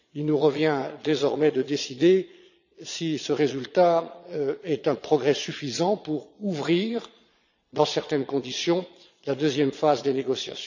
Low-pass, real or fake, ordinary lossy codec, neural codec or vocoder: 7.2 kHz; fake; none; vocoder, 22.05 kHz, 80 mel bands, Vocos